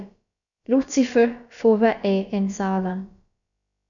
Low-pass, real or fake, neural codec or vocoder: 7.2 kHz; fake; codec, 16 kHz, about 1 kbps, DyCAST, with the encoder's durations